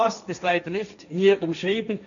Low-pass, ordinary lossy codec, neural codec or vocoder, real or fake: 7.2 kHz; none; codec, 16 kHz, 1.1 kbps, Voila-Tokenizer; fake